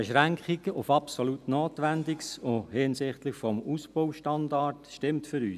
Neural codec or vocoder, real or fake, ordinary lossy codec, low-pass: none; real; none; 14.4 kHz